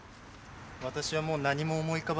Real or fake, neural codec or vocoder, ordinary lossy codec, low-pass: real; none; none; none